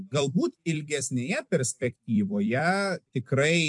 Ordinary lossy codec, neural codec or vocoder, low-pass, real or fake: MP3, 64 kbps; vocoder, 44.1 kHz, 128 mel bands every 256 samples, BigVGAN v2; 10.8 kHz; fake